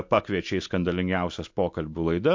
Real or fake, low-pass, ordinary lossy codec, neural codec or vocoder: real; 7.2 kHz; MP3, 48 kbps; none